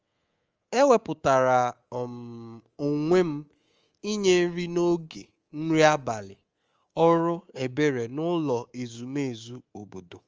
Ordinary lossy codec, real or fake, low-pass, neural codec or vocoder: Opus, 24 kbps; fake; 7.2 kHz; codec, 24 kHz, 3.1 kbps, DualCodec